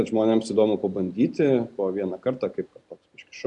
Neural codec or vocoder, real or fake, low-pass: none; real; 10.8 kHz